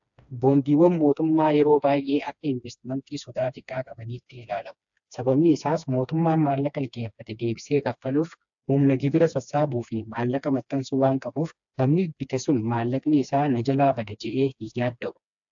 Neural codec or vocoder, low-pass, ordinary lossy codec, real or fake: codec, 16 kHz, 2 kbps, FreqCodec, smaller model; 7.2 kHz; AAC, 64 kbps; fake